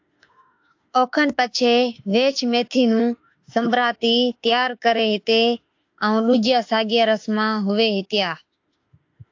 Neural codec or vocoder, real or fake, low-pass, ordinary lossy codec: autoencoder, 48 kHz, 32 numbers a frame, DAC-VAE, trained on Japanese speech; fake; 7.2 kHz; AAC, 48 kbps